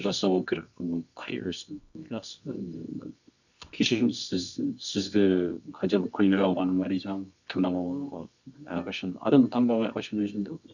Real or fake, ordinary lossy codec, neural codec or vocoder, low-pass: fake; none; codec, 24 kHz, 0.9 kbps, WavTokenizer, medium music audio release; 7.2 kHz